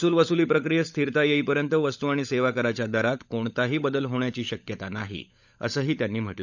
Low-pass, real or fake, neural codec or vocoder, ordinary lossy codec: 7.2 kHz; fake; codec, 16 kHz, 16 kbps, FunCodec, trained on LibriTTS, 50 frames a second; none